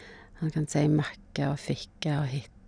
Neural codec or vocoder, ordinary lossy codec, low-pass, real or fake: none; none; 9.9 kHz; real